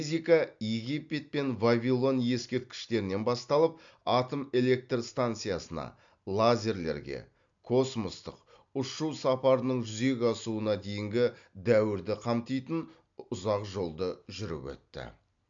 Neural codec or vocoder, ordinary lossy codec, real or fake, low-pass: none; MP3, 64 kbps; real; 7.2 kHz